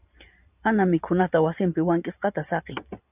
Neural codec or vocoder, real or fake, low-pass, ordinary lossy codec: vocoder, 44.1 kHz, 128 mel bands every 512 samples, BigVGAN v2; fake; 3.6 kHz; AAC, 32 kbps